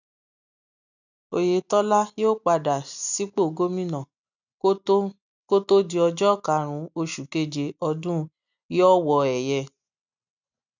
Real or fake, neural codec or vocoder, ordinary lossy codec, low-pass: real; none; none; 7.2 kHz